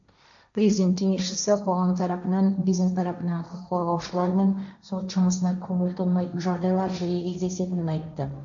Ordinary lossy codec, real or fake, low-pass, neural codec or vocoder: MP3, 64 kbps; fake; 7.2 kHz; codec, 16 kHz, 1.1 kbps, Voila-Tokenizer